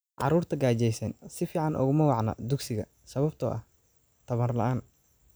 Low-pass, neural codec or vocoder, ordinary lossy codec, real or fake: none; none; none; real